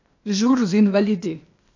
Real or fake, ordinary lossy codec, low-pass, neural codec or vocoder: fake; none; 7.2 kHz; codec, 16 kHz, 0.8 kbps, ZipCodec